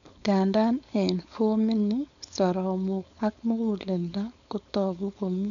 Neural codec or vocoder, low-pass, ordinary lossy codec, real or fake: codec, 16 kHz, 4.8 kbps, FACodec; 7.2 kHz; none; fake